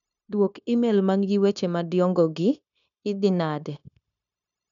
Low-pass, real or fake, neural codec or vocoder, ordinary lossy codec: 7.2 kHz; fake; codec, 16 kHz, 0.9 kbps, LongCat-Audio-Codec; none